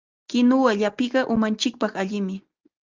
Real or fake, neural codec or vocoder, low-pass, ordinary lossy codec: real; none; 7.2 kHz; Opus, 32 kbps